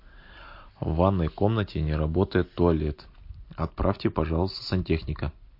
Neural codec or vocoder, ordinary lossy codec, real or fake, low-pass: none; MP3, 32 kbps; real; 5.4 kHz